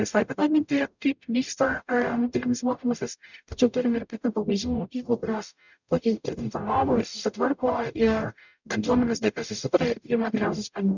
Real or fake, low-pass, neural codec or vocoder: fake; 7.2 kHz; codec, 44.1 kHz, 0.9 kbps, DAC